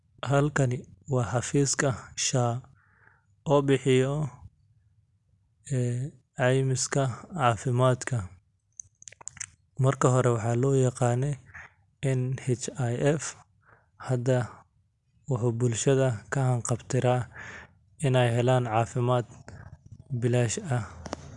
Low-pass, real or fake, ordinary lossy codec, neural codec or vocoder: 10.8 kHz; real; none; none